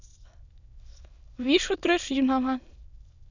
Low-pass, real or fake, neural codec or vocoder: 7.2 kHz; fake; autoencoder, 22.05 kHz, a latent of 192 numbers a frame, VITS, trained on many speakers